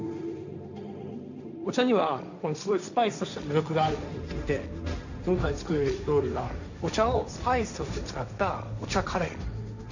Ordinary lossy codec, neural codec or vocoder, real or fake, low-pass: none; codec, 16 kHz, 1.1 kbps, Voila-Tokenizer; fake; 7.2 kHz